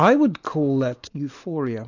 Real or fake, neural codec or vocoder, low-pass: real; none; 7.2 kHz